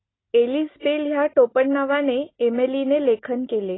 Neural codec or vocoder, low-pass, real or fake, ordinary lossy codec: none; 7.2 kHz; real; AAC, 16 kbps